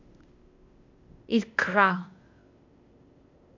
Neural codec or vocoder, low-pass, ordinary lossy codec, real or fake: codec, 16 kHz, 0.8 kbps, ZipCodec; 7.2 kHz; MP3, 64 kbps; fake